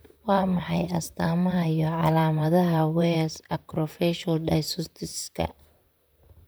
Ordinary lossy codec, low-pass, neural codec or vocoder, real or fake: none; none; vocoder, 44.1 kHz, 128 mel bands, Pupu-Vocoder; fake